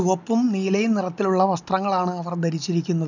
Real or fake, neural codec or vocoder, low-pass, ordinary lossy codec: real; none; 7.2 kHz; none